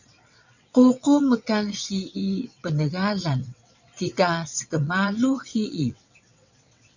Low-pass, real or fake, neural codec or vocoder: 7.2 kHz; fake; vocoder, 22.05 kHz, 80 mel bands, WaveNeXt